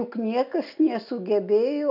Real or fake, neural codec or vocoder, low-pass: real; none; 5.4 kHz